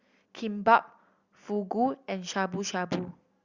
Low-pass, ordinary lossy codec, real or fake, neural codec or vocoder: 7.2 kHz; Opus, 64 kbps; real; none